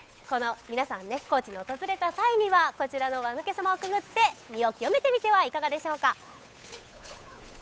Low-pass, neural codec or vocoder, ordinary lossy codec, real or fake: none; codec, 16 kHz, 8 kbps, FunCodec, trained on Chinese and English, 25 frames a second; none; fake